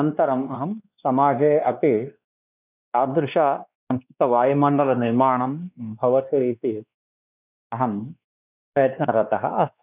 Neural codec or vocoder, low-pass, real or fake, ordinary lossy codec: codec, 16 kHz, 2 kbps, X-Codec, WavLM features, trained on Multilingual LibriSpeech; 3.6 kHz; fake; none